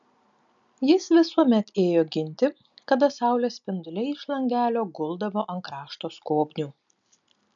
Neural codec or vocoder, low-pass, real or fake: none; 7.2 kHz; real